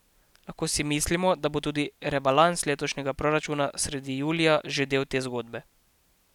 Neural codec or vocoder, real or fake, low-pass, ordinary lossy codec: none; real; 19.8 kHz; none